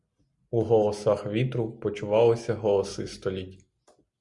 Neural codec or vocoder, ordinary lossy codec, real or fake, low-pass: none; Opus, 64 kbps; real; 10.8 kHz